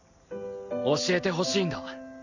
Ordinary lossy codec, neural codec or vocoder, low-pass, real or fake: none; none; 7.2 kHz; real